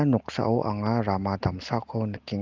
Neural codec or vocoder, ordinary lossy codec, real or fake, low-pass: none; Opus, 32 kbps; real; 7.2 kHz